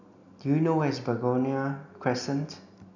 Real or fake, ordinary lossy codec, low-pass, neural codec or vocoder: real; none; 7.2 kHz; none